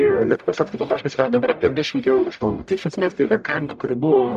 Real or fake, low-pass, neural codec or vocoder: fake; 14.4 kHz; codec, 44.1 kHz, 0.9 kbps, DAC